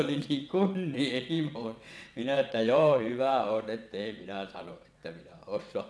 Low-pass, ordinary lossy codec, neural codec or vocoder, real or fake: none; none; vocoder, 22.05 kHz, 80 mel bands, WaveNeXt; fake